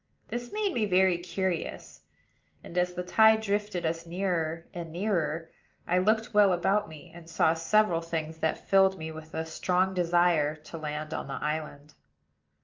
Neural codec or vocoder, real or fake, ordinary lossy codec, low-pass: none; real; Opus, 24 kbps; 7.2 kHz